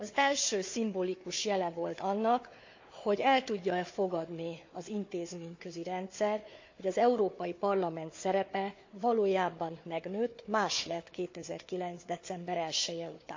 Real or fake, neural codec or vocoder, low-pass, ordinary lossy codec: fake; codec, 16 kHz, 4 kbps, FunCodec, trained on Chinese and English, 50 frames a second; 7.2 kHz; MP3, 48 kbps